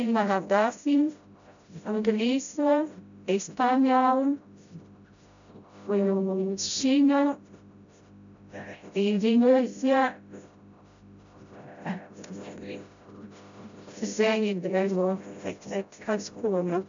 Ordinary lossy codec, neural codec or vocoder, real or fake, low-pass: MP3, 64 kbps; codec, 16 kHz, 0.5 kbps, FreqCodec, smaller model; fake; 7.2 kHz